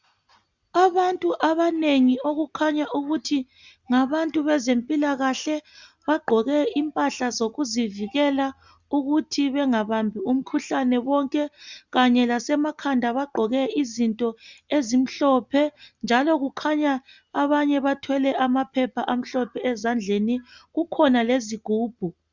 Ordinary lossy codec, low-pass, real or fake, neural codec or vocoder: Opus, 64 kbps; 7.2 kHz; real; none